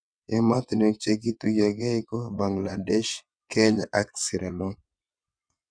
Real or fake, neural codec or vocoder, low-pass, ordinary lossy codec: fake; vocoder, 22.05 kHz, 80 mel bands, WaveNeXt; 9.9 kHz; none